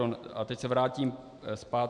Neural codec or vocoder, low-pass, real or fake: none; 10.8 kHz; real